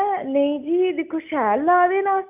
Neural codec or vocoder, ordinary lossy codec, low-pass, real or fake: none; none; 3.6 kHz; real